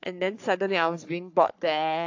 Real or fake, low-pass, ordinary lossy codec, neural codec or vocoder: fake; 7.2 kHz; AAC, 48 kbps; codec, 44.1 kHz, 3.4 kbps, Pupu-Codec